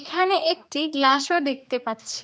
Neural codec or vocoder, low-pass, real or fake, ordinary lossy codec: codec, 16 kHz, 2 kbps, X-Codec, HuBERT features, trained on general audio; none; fake; none